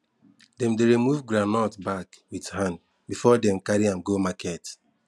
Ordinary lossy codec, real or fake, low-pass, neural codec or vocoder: none; real; none; none